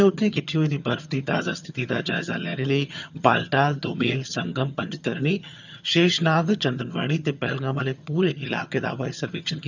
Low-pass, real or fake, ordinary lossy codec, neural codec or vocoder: 7.2 kHz; fake; none; vocoder, 22.05 kHz, 80 mel bands, HiFi-GAN